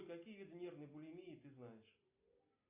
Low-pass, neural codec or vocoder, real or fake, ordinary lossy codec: 3.6 kHz; none; real; AAC, 32 kbps